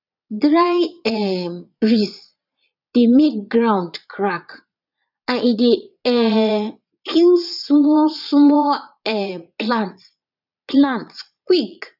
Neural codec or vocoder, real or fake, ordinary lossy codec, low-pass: vocoder, 22.05 kHz, 80 mel bands, Vocos; fake; none; 5.4 kHz